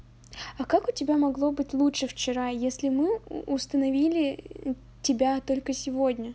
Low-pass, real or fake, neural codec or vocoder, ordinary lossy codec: none; real; none; none